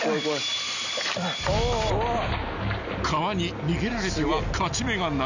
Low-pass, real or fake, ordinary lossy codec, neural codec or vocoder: 7.2 kHz; real; none; none